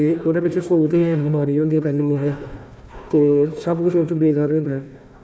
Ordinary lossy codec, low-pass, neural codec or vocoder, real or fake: none; none; codec, 16 kHz, 1 kbps, FunCodec, trained on Chinese and English, 50 frames a second; fake